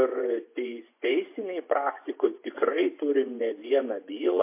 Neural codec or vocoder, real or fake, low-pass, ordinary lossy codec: vocoder, 24 kHz, 100 mel bands, Vocos; fake; 3.6 kHz; MP3, 24 kbps